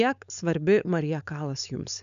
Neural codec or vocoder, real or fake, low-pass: codec, 16 kHz, 4.8 kbps, FACodec; fake; 7.2 kHz